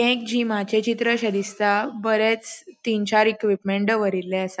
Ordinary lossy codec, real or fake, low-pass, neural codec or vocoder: none; real; none; none